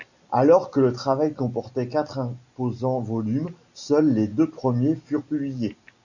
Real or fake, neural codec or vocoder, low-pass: real; none; 7.2 kHz